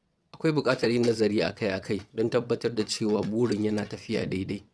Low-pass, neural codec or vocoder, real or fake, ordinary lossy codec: none; vocoder, 22.05 kHz, 80 mel bands, WaveNeXt; fake; none